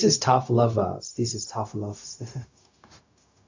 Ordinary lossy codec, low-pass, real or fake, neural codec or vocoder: AAC, 48 kbps; 7.2 kHz; fake; codec, 16 kHz, 0.4 kbps, LongCat-Audio-Codec